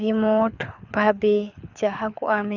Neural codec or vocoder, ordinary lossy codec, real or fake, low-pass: codec, 24 kHz, 6 kbps, HILCodec; none; fake; 7.2 kHz